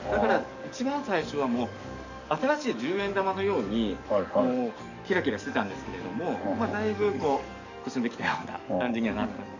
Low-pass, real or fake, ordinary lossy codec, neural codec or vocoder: 7.2 kHz; fake; none; codec, 44.1 kHz, 7.8 kbps, DAC